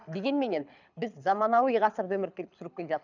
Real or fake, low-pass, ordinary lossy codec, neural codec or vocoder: fake; 7.2 kHz; none; codec, 24 kHz, 6 kbps, HILCodec